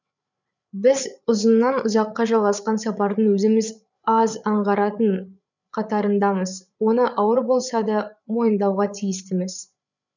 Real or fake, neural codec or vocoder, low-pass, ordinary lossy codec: fake; codec, 16 kHz, 8 kbps, FreqCodec, larger model; 7.2 kHz; none